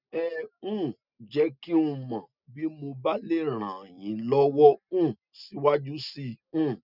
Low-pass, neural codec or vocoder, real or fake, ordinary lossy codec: 5.4 kHz; none; real; none